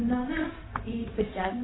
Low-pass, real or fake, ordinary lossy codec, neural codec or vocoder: 7.2 kHz; fake; AAC, 16 kbps; codec, 16 kHz, 0.4 kbps, LongCat-Audio-Codec